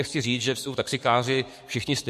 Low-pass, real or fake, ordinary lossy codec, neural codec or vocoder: 14.4 kHz; fake; MP3, 64 kbps; codec, 44.1 kHz, 7.8 kbps, DAC